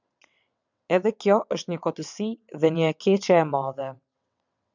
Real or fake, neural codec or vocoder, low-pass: fake; vocoder, 22.05 kHz, 80 mel bands, WaveNeXt; 7.2 kHz